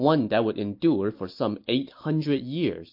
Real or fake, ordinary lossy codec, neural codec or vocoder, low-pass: real; MP3, 32 kbps; none; 5.4 kHz